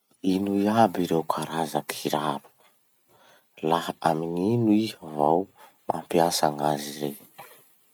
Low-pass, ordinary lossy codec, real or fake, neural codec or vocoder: none; none; real; none